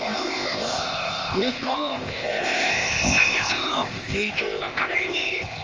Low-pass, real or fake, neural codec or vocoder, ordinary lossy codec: 7.2 kHz; fake; codec, 16 kHz, 0.8 kbps, ZipCodec; Opus, 32 kbps